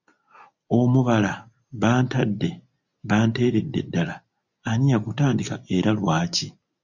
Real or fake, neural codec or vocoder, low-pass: real; none; 7.2 kHz